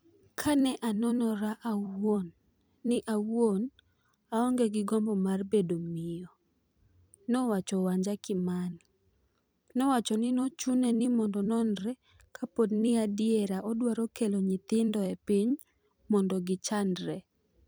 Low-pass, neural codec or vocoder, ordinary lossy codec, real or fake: none; vocoder, 44.1 kHz, 128 mel bands every 256 samples, BigVGAN v2; none; fake